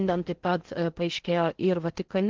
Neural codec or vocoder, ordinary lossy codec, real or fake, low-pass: codec, 16 kHz in and 24 kHz out, 0.6 kbps, FocalCodec, streaming, 4096 codes; Opus, 16 kbps; fake; 7.2 kHz